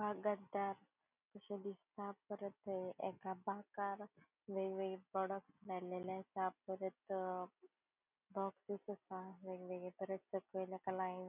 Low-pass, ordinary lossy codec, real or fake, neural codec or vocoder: 3.6 kHz; none; real; none